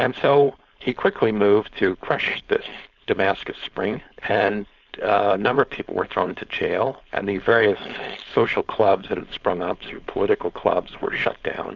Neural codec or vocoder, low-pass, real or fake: codec, 16 kHz, 4.8 kbps, FACodec; 7.2 kHz; fake